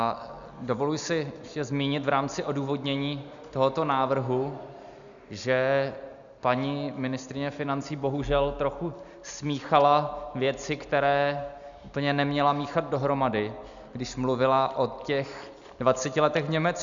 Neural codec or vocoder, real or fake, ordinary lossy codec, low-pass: none; real; MP3, 96 kbps; 7.2 kHz